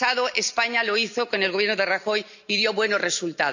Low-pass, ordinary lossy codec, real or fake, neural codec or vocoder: 7.2 kHz; none; real; none